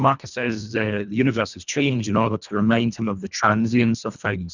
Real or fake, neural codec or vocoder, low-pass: fake; codec, 24 kHz, 1.5 kbps, HILCodec; 7.2 kHz